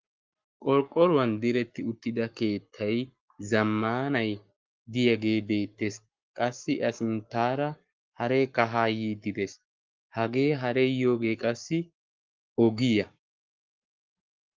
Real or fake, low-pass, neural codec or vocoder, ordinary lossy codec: fake; 7.2 kHz; codec, 44.1 kHz, 7.8 kbps, Pupu-Codec; Opus, 32 kbps